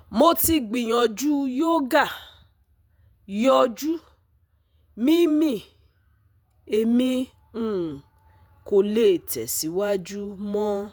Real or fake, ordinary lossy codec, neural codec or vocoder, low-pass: fake; none; vocoder, 48 kHz, 128 mel bands, Vocos; none